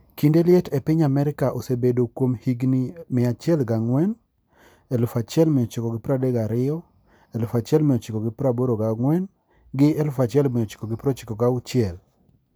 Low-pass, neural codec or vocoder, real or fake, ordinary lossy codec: none; none; real; none